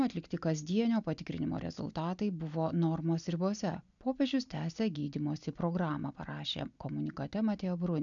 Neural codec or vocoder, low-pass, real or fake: none; 7.2 kHz; real